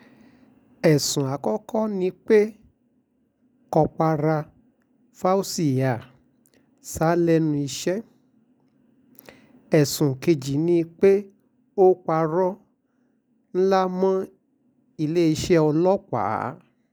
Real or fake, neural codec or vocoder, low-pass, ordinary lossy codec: real; none; none; none